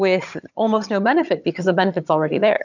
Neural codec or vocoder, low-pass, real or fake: vocoder, 22.05 kHz, 80 mel bands, HiFi-GAN; 7.2 kHz; fake